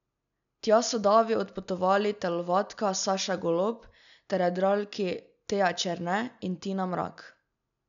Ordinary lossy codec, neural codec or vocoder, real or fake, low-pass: none; none; real; 7.2 kHz